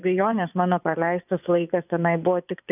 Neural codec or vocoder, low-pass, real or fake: vocoder, 24 kHz, 100 mel bands, Vocos; 3.6 kHz; fake